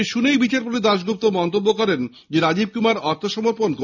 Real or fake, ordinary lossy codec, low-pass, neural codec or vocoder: real; none; 7.2 kHz; none